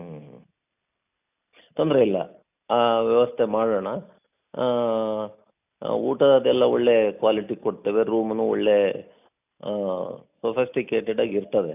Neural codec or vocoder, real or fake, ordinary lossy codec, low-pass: none; real; none; 3.6 kHz